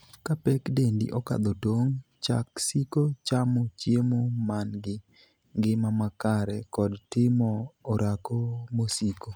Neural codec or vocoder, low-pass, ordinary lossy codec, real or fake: none; none; none; real